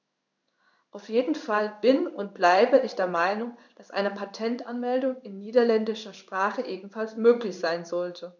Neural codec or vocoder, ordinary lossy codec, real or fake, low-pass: codec, 16 kHz in and 24 kHz out, 1 kbps, XY-Tokenizer; none; fake; 7.2 kHz